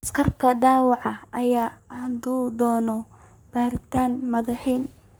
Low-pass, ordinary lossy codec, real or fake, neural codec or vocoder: none; none; fake; codec, 44.1 kHz, 3.4 kbps, Pupu-Codec